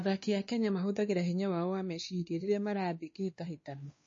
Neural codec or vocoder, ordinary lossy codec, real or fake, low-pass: codec, 16 kHz, 2 kbps, X-Codec, WavLM features, trained on Multilingual LibriSpeech; MP3, 32 kbps; fake; 7.2 kHz